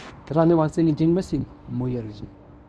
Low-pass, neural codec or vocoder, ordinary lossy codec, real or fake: none; codec, 24 kHz, 0.9 kbps, WavTokenizer, medium speech release version 1; none; fake